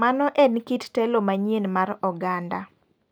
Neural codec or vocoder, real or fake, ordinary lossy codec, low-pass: none; real; none; none